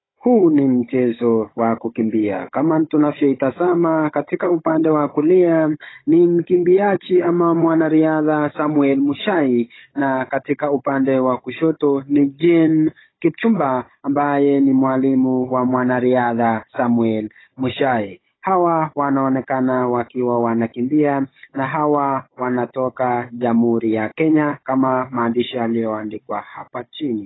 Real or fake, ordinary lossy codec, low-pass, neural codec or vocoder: fake; AAC, 16 kbps; 7.2 kHz; codec, 16 kHz, 16 kbps, FunCodec, trained on Chinese and English, 50 frames a second